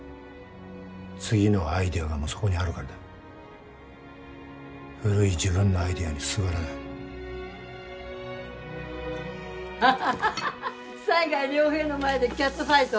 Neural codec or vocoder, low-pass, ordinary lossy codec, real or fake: none; none; none; real